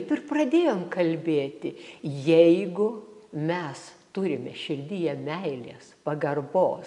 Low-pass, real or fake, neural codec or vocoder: 10.8 kHz; real; none